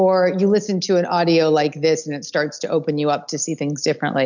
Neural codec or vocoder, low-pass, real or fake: none; 7.2 kHz; real